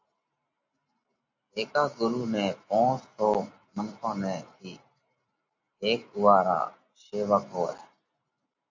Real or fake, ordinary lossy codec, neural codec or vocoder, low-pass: real; AAC, 48 kbps; none; 7.2 kHz